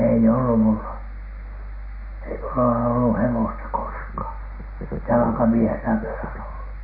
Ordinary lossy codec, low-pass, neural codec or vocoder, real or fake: MP3, 24 kbps; 5.4 kHz; none; real